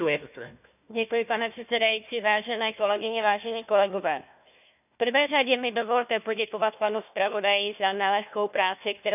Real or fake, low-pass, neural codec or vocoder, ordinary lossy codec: fake; 3.6 kHz; codec, 16 kHz, 1 kbps, FunCodec, trained on Chinese and English, 50 frames a second; none